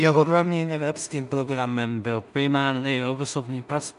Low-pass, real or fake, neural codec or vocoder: 10.8 kHz; fake; codec, 16 kHz in and 24 kHz out, 0.4 kbps, LongCat-Audio-Codec, two codebook decoder